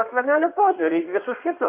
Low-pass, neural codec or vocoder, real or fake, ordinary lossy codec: 3.6 kHz; codec, 16 kHz in and 24 kHz out, 1.1 kbps, FireRedTTS-2 codec; fake; AAC, 32 kbps